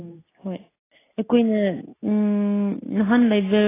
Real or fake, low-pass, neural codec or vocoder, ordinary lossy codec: real; 3.6 kHz; none; AAC, 16 kbps